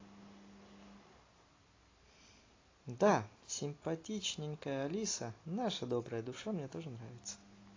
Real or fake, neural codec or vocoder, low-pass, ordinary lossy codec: real; none; 7.2 kHz; AAC, 32 kbps